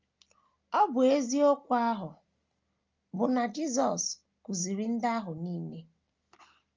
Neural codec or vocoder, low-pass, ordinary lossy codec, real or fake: none; 7.2 kHz; Opus, 32 kbps; real